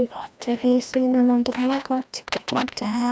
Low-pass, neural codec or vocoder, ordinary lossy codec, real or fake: none; codec, 16 kHz, 1 kbps, FreqCodec, larger model; none; fake